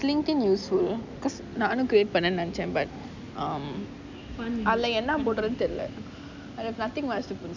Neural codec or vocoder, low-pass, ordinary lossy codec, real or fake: none; 7.2 kHz; none; real